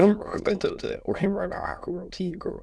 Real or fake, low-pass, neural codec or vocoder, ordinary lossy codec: fake; none; autoencoder, 22.05 kHz, a latent of 192 numbers a frame, VITS, trained on many speakers; none